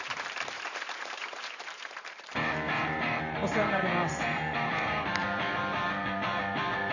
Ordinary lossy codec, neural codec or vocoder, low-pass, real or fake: none; vocoder, 44.1 kHz, 128 mel bands every 512 samples, BigVGAN v2; 7.2 kHz; fake